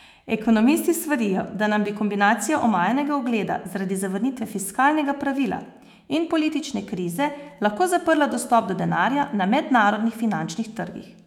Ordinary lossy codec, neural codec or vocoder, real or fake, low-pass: none; autoencoder, 48 kHz, 128 numbers a frame, DAC-VAE, trained on Japanese speech; fake; 19.8 kHz